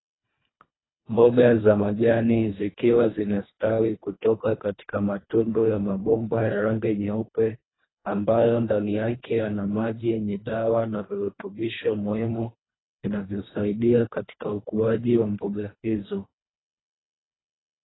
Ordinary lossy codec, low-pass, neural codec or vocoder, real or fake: AAC, 16 kbps; 7.2 kHz; codec, 24 kHz, 1.5 kbps, HILCodec; fake